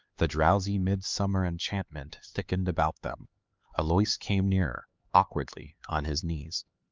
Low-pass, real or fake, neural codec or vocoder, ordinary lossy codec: 7.2 kHz; fake; codec, 16 kHz, 4 kbps, X-Codec, HuBERT features, trained on LibriSpeech; Opus, 24 kbps